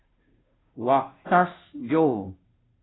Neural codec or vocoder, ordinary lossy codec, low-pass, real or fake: codec, 16 kHz, 0.5 kbps, FunCodec, trained on Chinese and English, 25 frames a second; AAC, 16 kbps; 7.2 kHz; fake